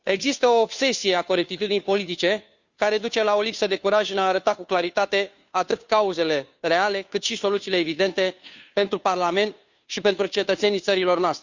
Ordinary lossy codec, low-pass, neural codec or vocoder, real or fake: Opus, 64 kbps; 7.2 kHz; codec, 16 kHz, 2 kbps, FunCodec, trained on Chinese and English, 25 frames a second; fake